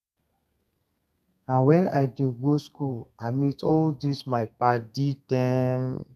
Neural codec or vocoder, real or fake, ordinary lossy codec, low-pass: codec, 32 kHz, 1.9 kbps, SNAC; fake; none; 14.4 kHz